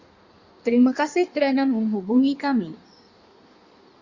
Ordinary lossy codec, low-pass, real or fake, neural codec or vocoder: Opus, 64 kbps; 7.2 kHz; fake; codec, 16 kHz in and 24 kHz out, 1.1 kbps, FireRedTTS-2 codec